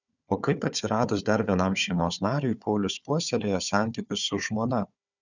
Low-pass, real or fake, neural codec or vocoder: 7.2 kHz; fake; codec, 16 kHz, 4 kbps, FunCodec, trained on Chinese and English, 50 frames a second